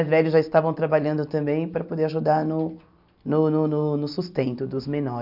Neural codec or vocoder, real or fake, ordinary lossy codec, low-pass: none; real; none; 5.4 kHz